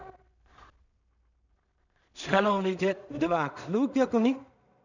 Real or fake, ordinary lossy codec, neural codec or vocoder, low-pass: fake; none; codec, 16 kHz in and 24 kHz out, 0.4 kbps, LongCat-Audio-Codec, two codebook decoder; 7.2 kHz